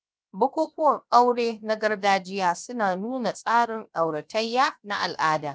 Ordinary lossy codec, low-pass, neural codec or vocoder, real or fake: none; none; codec, 16 kHz, 0.7 kbps, FocalCodec; fake